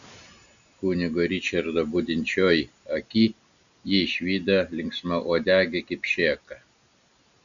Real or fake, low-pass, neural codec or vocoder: real; 7.2 kHz; none